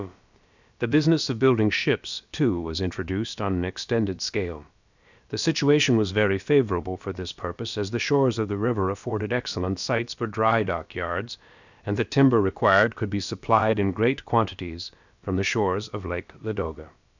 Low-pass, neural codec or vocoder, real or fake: 7.2 kHz; codec, 16 kHz, about 1 kbps, DyCAST, with the encoder's durations; fake